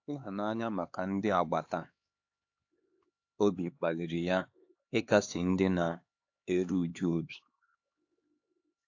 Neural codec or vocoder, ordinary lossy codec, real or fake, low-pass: codec, 16 kHz, 4 kbps, X-Codec, HuBERT features, trained on LibriSpeech; AAC, 48 kbps; fake; 7.2 kHz